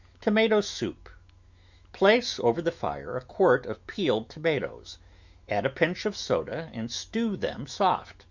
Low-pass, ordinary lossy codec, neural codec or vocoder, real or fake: 7.2 kHz; Opus, 64 kbps; autoencoder, 48 kHz, 128 numbers a frame, DAC-VAE, trained on Japanese speech; fake